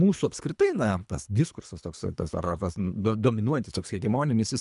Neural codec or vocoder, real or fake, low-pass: codec, 24 kHz, 3 kbps, HILCodec; fake; 10.8 kHz